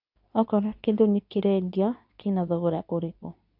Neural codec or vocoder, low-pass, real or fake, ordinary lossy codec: codec, 24 kHz, 0.9 kbps, WavTokenizer, medium speech release version 2; 5.4 kHz; fake; none